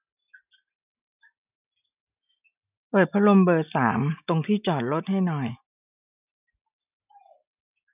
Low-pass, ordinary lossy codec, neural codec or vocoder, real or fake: 3.6 kHz; none; none; real